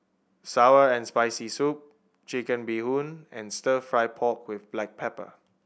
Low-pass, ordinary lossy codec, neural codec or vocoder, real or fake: none; none; none; real